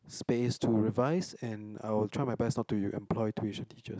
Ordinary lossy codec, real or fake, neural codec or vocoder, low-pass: none; real; none; none